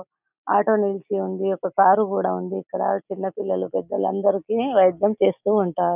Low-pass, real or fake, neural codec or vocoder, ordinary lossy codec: 3.6 kHz; real; none; none